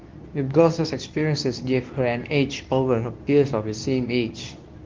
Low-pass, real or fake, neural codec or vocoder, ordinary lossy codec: 7.2 kHz; fake; codec, 24 kHz, 0.9 kbps, WavTokenizer, medium speech release version 2; Opus, 24 kbps